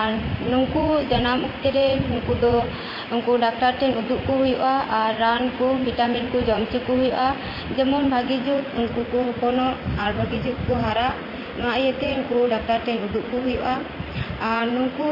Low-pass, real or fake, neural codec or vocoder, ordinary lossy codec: 5.4 kHz; fake; vocoder, 44.1 kHz, 80 mel bands, Vocos; MP3, 24 kbps